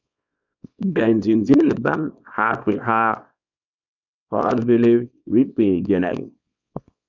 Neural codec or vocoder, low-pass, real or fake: codec, 24 kHz, 0.9 kbps, WavTokenizer, small release; 7.2 kHz; fake